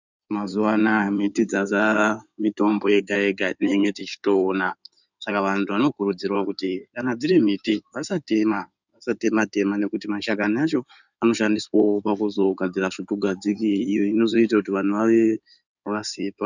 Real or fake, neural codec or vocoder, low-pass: fake; codec, 16 kHz in and 24 kHz out, 2.2 kbps, FireRedTTS-2 codec; 7.2 kHz